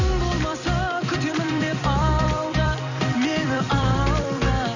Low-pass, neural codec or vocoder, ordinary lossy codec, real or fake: 7.2 kHz; vocoder, 44.1 kHz, 128 mel bands every 256 samples, BigVGAN v2; none; fake